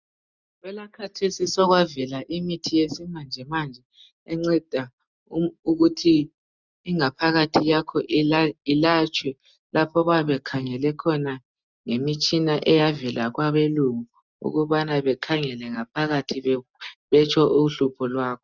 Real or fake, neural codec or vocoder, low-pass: real; none; 7.2 kHz